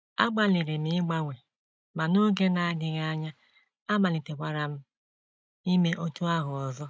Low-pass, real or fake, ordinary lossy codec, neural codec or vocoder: none; real; none; none